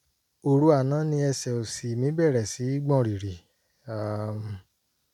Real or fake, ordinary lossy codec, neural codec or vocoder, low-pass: real; none; none; 19.8 kHz